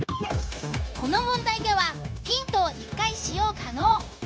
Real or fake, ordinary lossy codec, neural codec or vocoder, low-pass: fake; none; codec, 16 kHz, 0.9 kbps, LongCat-Audio-Codec; none